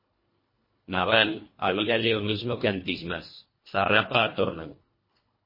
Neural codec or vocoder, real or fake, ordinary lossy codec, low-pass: codec, 24 kHz, 1.5 kbps, HILCodec; fake; MP3, 24 kbps; 5.4 kHz